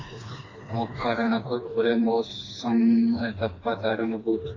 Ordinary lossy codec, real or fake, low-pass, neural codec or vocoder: AAC, 32 kbps; fake; 7.2 kHz; codec, 16 kHz, 2 kbps, FreqCodec, smaller model